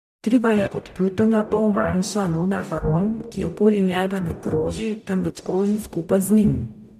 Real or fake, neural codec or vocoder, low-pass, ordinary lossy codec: fake; codec, 44.1 kHz, 0.9 kbps, DAC; 14.4 kHz; none